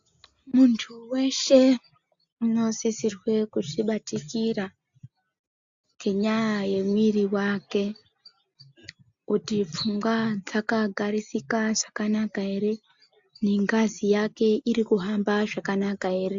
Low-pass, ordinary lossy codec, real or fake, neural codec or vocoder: 7.2 kHz; AAC, 64 kbps; real; none